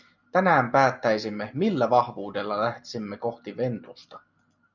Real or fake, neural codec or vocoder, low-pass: real; none; 7.2 kHz